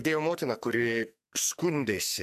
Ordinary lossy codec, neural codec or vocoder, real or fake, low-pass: MP3, 96 kbps; codec, 44.1 kHz, 2.6 kbps, SNAC; fake; 14.4 kHz